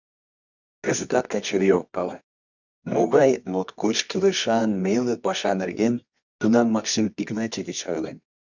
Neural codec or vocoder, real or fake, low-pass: codec, 24 kHz, 0.9 kbps, WavTokenizer, medium music audio release; fake; 7.2 kHz